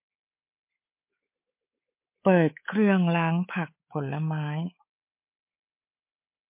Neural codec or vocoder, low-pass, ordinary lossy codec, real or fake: codec, 24 kHz, 3.1 kbps, DualCodec; 3.6 kHz; MP3, 24 kbps; fake